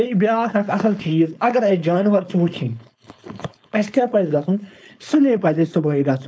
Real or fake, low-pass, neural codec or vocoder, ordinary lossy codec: fake; none; codec, 16 kHz, 4.8 kbps, FACodec; none